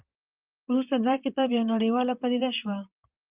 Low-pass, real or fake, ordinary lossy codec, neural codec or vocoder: 3.6 kHz; fake; Opus, 24 kbps; vocoder, 44.1 kHz, 128 mel bands, Pupu-Vocoder